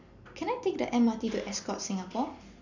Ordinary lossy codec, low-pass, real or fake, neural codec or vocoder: none; 7.2 kHz; real; none